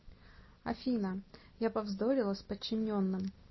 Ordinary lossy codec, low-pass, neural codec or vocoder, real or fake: MP3, 24 kbps; 7.2 kHz; none; real